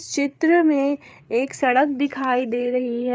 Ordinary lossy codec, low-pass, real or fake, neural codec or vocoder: none; none; fake; codec, 16 kHz, 8 kbps, FreqCodec, larger model